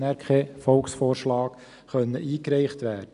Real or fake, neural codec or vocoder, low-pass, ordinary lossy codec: real; none; 10.8 kHz; none